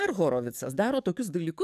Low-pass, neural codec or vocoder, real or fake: 14.4 kHz; codec, 44.1 kHz, 7.8 kbps, DAC; fake